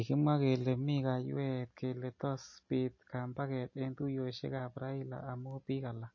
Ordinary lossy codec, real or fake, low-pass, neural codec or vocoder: MP3, 32 kbps; real; 7.2 kHz; none